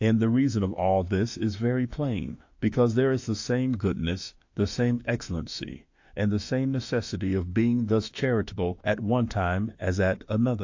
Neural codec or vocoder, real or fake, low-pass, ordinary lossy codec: autoencoder, 48 kHz, 32 numbers a frame, DAC-VAE, trained on Japanese speech; fake; 7.2 kHz; AAC, 48 kbps